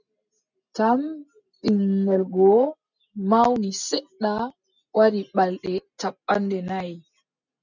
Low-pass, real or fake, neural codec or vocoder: 7.2 kHz; real; none